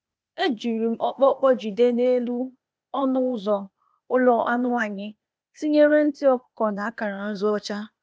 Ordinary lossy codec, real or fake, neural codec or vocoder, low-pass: none; fake; codec, 16 kHz, 0.8 kbps, ZipCodec; none